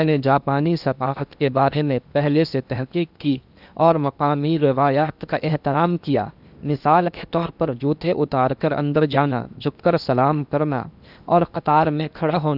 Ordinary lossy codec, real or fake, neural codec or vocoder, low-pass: none; fake; codec, 16 kHz in and 24 kHz out, 0.8 kbps, FocalCodec, streaming, 65536 codes; 5.4 kHz